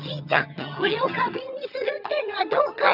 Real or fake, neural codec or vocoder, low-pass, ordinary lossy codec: fake; vocoder, 22.05 kHz, 80 mel bands, HiFi-GAN; 5.4 kHz; none